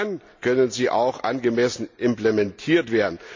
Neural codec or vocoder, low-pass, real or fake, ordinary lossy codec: none; 7.2 kHz; real; MP3, 48 kbps